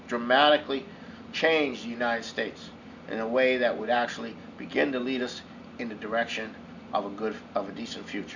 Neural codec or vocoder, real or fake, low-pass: none; real; 7.2 kHz